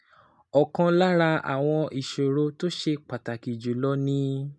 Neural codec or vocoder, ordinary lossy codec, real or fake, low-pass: none; none; real; 10.8 kHz